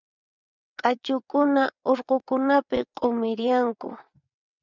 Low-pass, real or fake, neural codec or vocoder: 7.2 kHz; fake; vocoder, 22.05 kHz, 80 mel bands, WaveNeXt